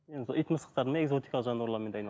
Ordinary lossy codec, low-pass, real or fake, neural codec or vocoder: none; none; real; none